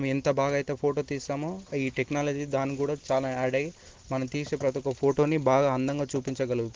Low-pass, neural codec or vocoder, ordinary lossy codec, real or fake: 7.2 kHz; none; Opus, 16 kbps; real